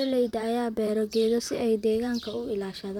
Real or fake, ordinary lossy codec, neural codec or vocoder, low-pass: fake; none; vocoder, 44.1 kHz, 128 mel bands, Pupu-Vocoder; 19.8 kHz